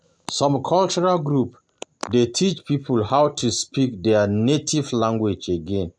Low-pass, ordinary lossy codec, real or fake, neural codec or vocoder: none; none; real; none